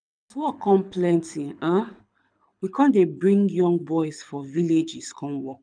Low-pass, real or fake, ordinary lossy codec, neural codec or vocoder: 9.9 kHz; fake; none; codec, 24 kHz, 6 kbps, HILCodec